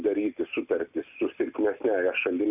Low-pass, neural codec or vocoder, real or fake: 3.6 kHz; none; real